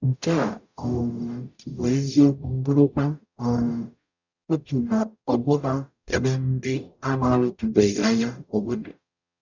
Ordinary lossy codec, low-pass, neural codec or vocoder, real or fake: none; 7.2 kHz; codec, 44.1 kHz, 0.9 kbps, DAC; fake